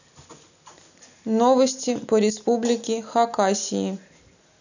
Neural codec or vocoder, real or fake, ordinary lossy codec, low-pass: none; real; none; 7.2 kHz